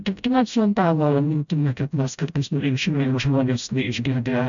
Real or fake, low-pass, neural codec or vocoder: fake; 7.2 kHz; codec, 16 kHz, 0.5 kbps, FreqCodec, smaller model